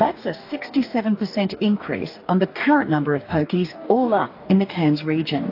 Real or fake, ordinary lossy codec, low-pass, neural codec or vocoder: fake; AAC, 32 kbps; 5.4 kHz; codec, 44.1 kHz, 2.6 kbps, DAC